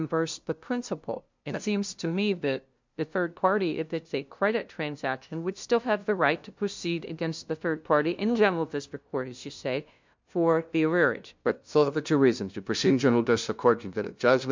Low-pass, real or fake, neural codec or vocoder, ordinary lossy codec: 7.2 kHz; fake; codec, 16 kHz, 0.5 kbps, FunCodec, trained on LibriTTS, 25 frames a second; MP3, 64 kbps